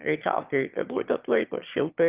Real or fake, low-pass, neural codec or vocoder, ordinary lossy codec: fake; 3.6 kHz; autoencoder, 22.05 kHz, a latent of 192 numbers a frame, VITS, trained on one speaker; Opus, 32 kbps